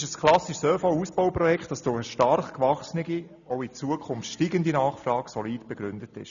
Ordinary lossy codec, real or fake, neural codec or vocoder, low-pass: none; real; none; 7.2 kHz